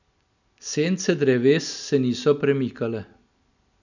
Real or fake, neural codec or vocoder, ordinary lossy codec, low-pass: real; none; none; 7.2 kHz